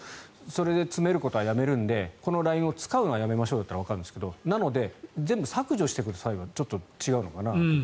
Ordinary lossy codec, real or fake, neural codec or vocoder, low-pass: none; real; none; none